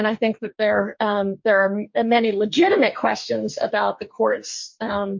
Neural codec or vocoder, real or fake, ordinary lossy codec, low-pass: codec, 44.1 kHz, 2.6 kbps, DAC; fake; MP3, 48 kbps; 7.2 kHz